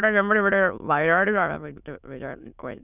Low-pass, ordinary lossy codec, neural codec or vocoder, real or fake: 3.6 kHz; none; autoencoder, 22.05 kHz, a latent of 192 numbers a frame, VITS, trained on many speakers; fake